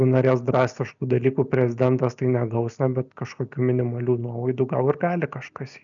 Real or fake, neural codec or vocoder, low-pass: real; none; 7.2 kHz